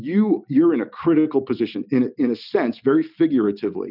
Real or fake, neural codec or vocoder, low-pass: real; none; 5.4 kHz